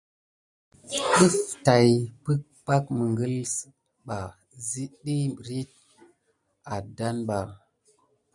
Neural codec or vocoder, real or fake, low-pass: none; real; 10.8 kHz